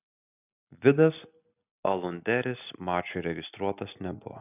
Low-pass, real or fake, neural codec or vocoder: 3.6 kHz; real; none